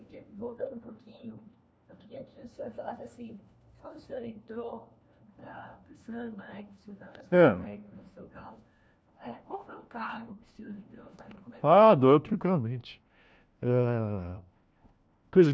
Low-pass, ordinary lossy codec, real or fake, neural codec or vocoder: none; none; fake; codec, 16 kHz, 1 kbps, FunCodec, trained on LibriTTS, 50 frames a second